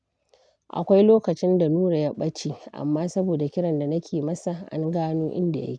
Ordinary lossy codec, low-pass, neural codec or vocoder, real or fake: none; 9.9 kHz; none; real